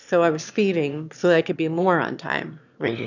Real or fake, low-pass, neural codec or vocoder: fake; 7.2 kHz; autoencoder, 22.05 kHz, a latent of 192 numbers a frame, VITS, trained on one speaker